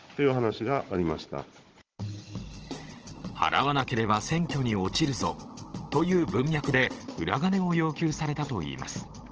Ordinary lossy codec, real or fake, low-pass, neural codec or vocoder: Opus, 16 kbps; fake; 7.2 kHz; codec, 16 kHz, 16 kbps, FunCodec, trained on Chinese and English, 50 frames a second